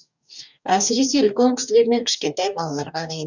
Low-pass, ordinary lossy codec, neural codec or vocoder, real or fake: 7.2 kHz; none; codec, 44.1 kHz, 2.6 kbps, DAC; fake